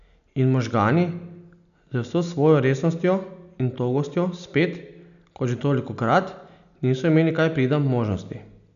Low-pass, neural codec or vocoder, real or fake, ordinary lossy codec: 7.2 kHz; none; real; none